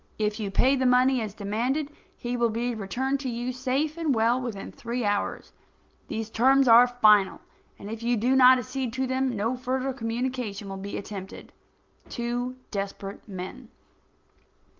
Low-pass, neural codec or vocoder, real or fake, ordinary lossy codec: 7.2 kHz; none; real; Opus, 32 kbps